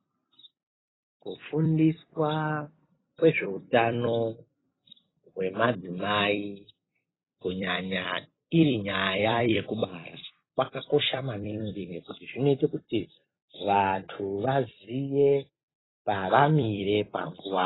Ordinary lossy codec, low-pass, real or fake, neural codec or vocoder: AAC, 16 kbps; 7.2 kHz; fake; vocoder, 44.1 kHz, 128 mel bands every 256 samples, BigVGAN v2